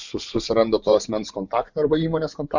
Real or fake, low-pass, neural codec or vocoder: fake; 7.2 kHz; vocoder, 24 kHz, 100 mel bands, Vocos